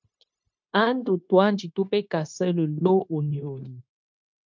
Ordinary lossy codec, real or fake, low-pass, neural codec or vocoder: MP3, 64 kbps; fake; 7.2 kHz; codec, 16 kHz, 0.9 kbps, LongCat-Audio-Codec